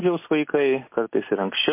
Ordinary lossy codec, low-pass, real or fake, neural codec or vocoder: MP3, 24 kbps; 3.6 kHz; real; none